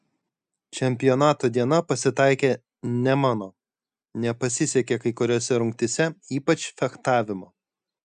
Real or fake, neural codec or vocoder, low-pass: real; none; 9.9 kHz